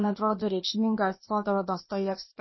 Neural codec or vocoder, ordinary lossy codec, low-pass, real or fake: codec, 16 kHz, 0.8 kbps, ZipCodec; MP3, 24 kbps; 7.2 kHz; fake